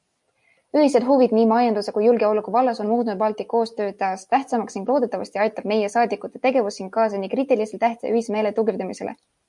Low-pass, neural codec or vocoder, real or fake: 10.8 kHz; none; real